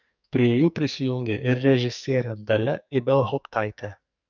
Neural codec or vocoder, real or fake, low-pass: codec, 44.1 kHz, 2.6 kbps, SNAC; fake; 7.2 kHz